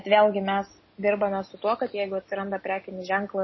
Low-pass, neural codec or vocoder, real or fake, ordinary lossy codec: 7.2 kHz; none; real; MP3, 24 kbps